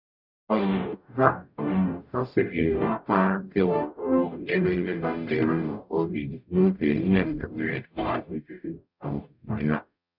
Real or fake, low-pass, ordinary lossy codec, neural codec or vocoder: fake; 5.4 kHz; none; codec, 44.1 kHz, 0.9 kbps, DAC